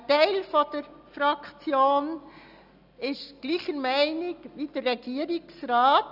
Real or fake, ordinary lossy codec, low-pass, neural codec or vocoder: real; none; 5.4 kHz; none